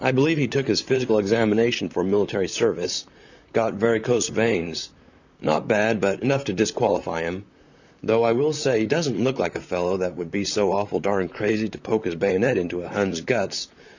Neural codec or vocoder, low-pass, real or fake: vocoder, 44.1 kHz, 128 mel bands, Pupu-Vocoder; 7.2 kHz; fake